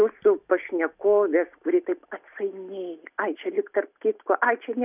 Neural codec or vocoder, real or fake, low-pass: none; real; 3.6 kHz